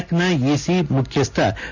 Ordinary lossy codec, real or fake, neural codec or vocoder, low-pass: none; real; none; 7.2 kHz